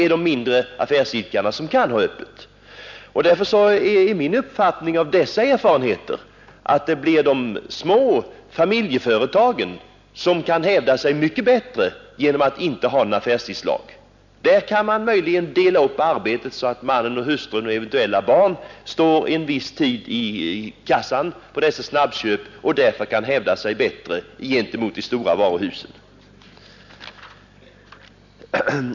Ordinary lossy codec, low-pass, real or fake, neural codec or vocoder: none; 7.2 kHz; real; none